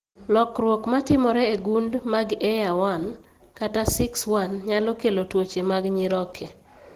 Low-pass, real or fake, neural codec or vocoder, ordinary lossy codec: 14.4 kHz; real; none; Opus, 16 kbps